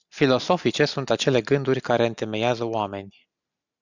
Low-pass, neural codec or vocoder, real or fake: 7.2 kHz; none; real